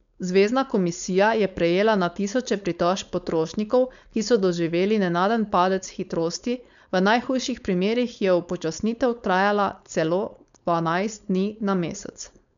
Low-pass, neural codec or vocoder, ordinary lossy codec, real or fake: 7.2 kHz; codec, 16 kHz, 4.8 kbps, FACodec; none; fake